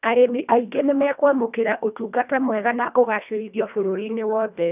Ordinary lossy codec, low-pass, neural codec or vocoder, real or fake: none; 3.6 kHz; codec, 24 kHz, 1.5 kbps, HILCodec; fake